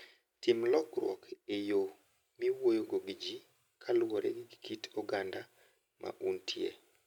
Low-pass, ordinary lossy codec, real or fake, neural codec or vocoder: 19.8 kHz; none; real; none